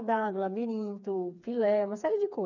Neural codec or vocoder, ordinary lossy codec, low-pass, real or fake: codec, 16 kHz, 4 kbps, FreqCodec, smaller model; none; 7.2 kHz; fake